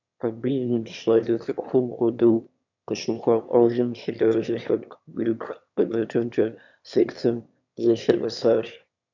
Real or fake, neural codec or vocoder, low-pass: fake; autoencoder, 22.05 kHz, a latent of 192 numbers a frame, VITS, trained on one speaker; 7.2 kHz